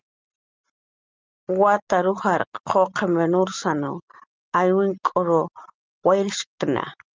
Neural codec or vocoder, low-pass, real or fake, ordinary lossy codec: none; 7.2 kHz; real; Opus, 32 kbps